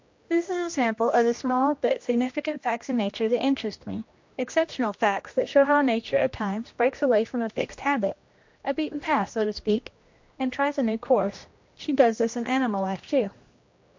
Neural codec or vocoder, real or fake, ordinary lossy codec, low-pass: codec, 16 kHz, 1 kbps, X-Codec, HuBERT features, trained on general audio; fake; MP3, 48 kbps; 7.2 kHz